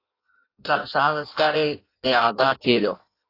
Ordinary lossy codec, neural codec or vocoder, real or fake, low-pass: AAC, 32 kbps; codec, 16 kHz in and 24 kHz out, 0.6 kbps, FireRedTTS-2 codec; fake; 5.4 kHz